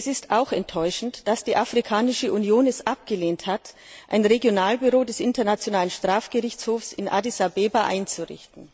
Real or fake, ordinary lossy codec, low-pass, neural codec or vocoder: real; none; none; none